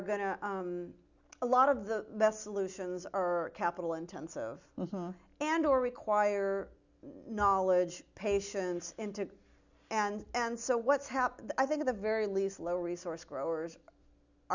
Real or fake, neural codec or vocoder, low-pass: real; none; 7.2 kHz